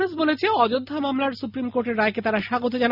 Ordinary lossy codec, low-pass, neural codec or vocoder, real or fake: none; 5.4 kHz; none; real